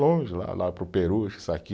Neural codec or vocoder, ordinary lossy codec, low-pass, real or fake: none; none; none; real